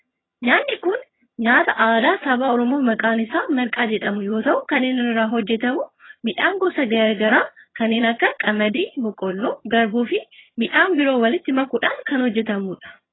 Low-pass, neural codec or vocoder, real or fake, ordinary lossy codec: 7.2 kHz; vocoder, 22.05 kHz, 80 mel bands, HiFi-GAN; fake; AAC, 16 kbps